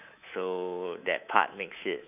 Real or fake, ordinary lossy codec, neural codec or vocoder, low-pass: fake; none; codec, 24 kHz, 3.1 kbps, DualCodec; 3.6 kHz